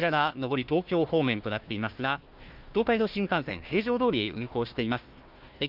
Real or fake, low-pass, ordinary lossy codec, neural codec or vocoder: fake; 5.4 kHz; Opus, 24 kbps; codec, 16 kHz, 1 kbps, FunCodec, trained on Chinese and English, 50 frames a second